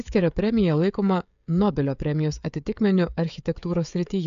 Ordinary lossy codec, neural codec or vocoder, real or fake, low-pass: AAC, 96 kbps; codec, 16 kHz, 16 kbps, FreqCodec, smaller model; fake; 7.2 kHz